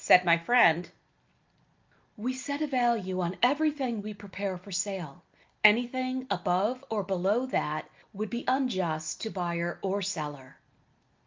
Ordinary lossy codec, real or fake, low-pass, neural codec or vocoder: Opus, 24 kbps; real; 7.2 kHz; none